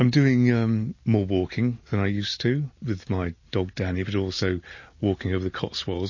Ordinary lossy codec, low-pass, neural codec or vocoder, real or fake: MP3, 32 kbps; 7.2 kHz; none; real